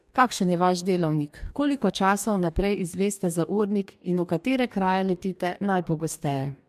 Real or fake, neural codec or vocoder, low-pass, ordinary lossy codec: fake; codec, 44.1 kHz, 2.6 kbps, DAC; 14.4 kHz; MP3, 96 kbps